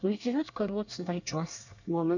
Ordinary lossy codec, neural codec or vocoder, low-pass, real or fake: none; codec, 24 kHz, 1 kbps, SNAC; 7.2 kHz; fake